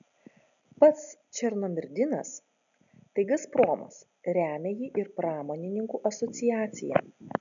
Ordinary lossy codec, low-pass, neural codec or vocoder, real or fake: MP3, 96 kbps; 7.2 kHz; none; real